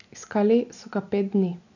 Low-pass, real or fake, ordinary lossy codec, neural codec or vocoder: 7.2 kHz; real; none; none